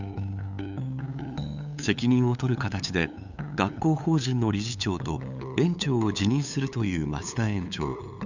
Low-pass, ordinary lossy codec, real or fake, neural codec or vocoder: 7.2 kHz; none; fake; codec, 16 kHz, 8 kbps, FunCodec, trained on LibriTTS, 25 frames a second